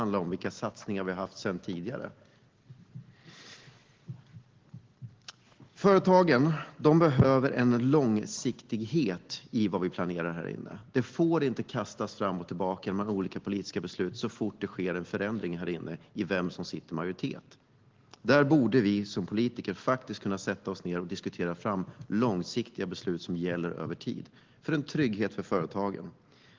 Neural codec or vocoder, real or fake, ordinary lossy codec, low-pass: none; real; Opus, 16 kbps; 7.2 kHz